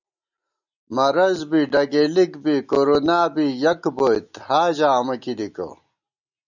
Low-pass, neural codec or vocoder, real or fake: 7.2 kHz; none; real